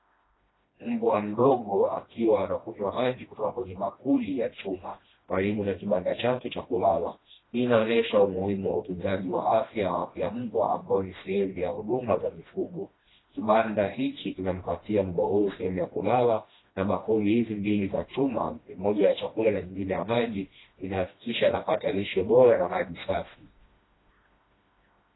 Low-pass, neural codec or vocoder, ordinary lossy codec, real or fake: 7.2 kHz; codec, 16 kHz, 1 kbps, FreqCodec, smaller model; AAC, 16 kbps; fake